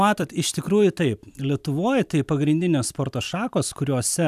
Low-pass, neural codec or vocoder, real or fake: 14.4 kHz; vocoder, 44.1 kHz, 128 mel bands every 256 samples, BigVGAN v2; fake